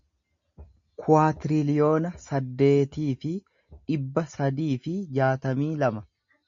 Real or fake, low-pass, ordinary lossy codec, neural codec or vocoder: real; 7.2 kHz; MP3, 64 kbps; none